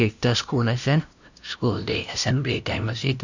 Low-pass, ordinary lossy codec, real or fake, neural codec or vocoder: 7.2 kHz; none; fake; codec, 16 kHz, 0.5 kbps, FunCodec, trained on LibriTTS, 25 frames a second